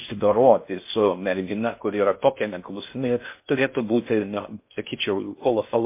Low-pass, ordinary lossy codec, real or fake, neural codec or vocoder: 3.6 kHz; MP3, 24 kbps; fake; codec, 16 kHz in and 24 kHz out, 0.6 kbps, FocalCodec, streaming, 4096 codes